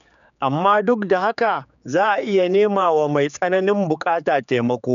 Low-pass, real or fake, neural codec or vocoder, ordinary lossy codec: 7.2 kHz; fake; codec, 16 kHz, 4 kbps, X-Codec, HuBERT features, trained on general audio; none